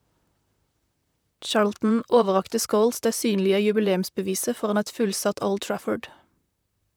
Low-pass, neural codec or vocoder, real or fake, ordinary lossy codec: none; vocoder, 44.1 kHz, 128 mel bands, Pupu-Vocoder; fake; none